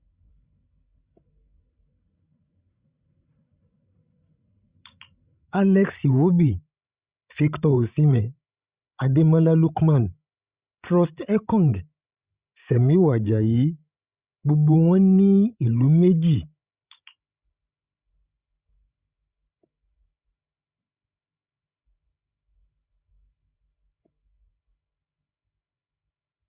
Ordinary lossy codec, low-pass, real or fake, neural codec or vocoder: Opus, 64 kbps; 3.6 kHz; fake; codec, 16 kHz, 16 kbps, FreqCodec, larger model